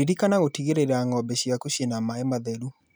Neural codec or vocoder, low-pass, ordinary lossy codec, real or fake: none; none; none; real